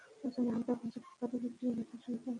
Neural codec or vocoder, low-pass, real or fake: none; 10.8 kHz; real